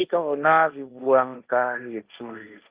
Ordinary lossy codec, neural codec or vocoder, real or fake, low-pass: Opus, 32 kbps; codec, 16 kHz, 1.1 kbps, Voila-Tokenizer; fake; 3.6 kHz